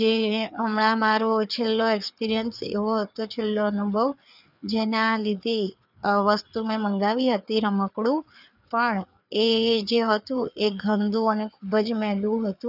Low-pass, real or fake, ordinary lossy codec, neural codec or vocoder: 5.4 kHz; fake; none; codec, 16 kHz, 4 kbps, FreqCodec, larger model